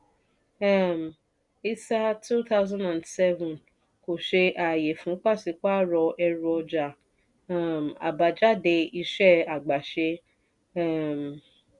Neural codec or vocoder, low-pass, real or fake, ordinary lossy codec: none; 10.8 kHz; real; none